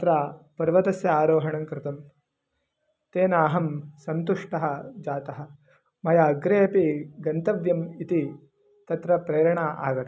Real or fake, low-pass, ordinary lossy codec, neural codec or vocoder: real; none; none; none